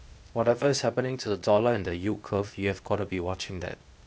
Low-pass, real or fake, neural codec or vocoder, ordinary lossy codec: none; fake; codec, 16 kHz, 0.8 kbps, ZipCodec; none